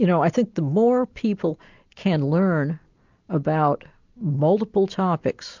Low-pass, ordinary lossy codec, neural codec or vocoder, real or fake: 7.2 kHz; MP3, 64 kbps; none; real